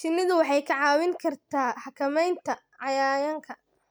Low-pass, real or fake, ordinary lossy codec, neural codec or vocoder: none; real; none; none